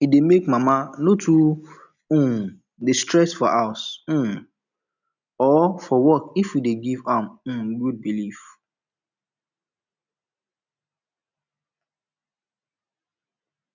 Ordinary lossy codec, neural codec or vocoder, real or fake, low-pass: none; none; real; 7.2 kHz